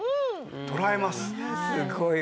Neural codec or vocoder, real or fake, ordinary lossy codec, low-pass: none; real; none; none